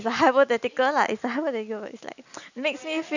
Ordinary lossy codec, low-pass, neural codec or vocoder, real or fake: none; 7.2 kHz; none; real